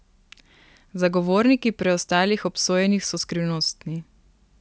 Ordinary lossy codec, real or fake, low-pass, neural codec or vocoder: none; real; none; none